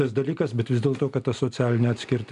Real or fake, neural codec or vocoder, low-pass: real; none; 10.8 kHz